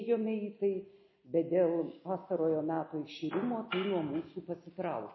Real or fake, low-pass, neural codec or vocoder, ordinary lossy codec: real; 7.2 kHz; none; MP3, 24 kbps